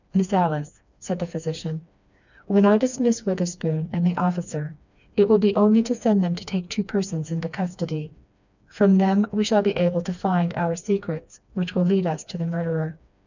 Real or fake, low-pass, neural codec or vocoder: fake; 7.2 kHz; codec, 16 kHz, 2 kbps, FreqCodec, smaller model